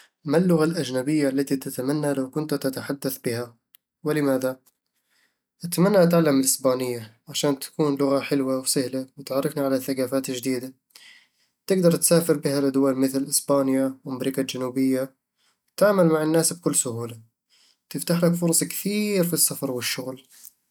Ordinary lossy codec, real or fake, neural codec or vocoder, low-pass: none; real; none; none